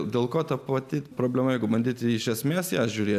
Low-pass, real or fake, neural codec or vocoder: 14.4 kHz; real; none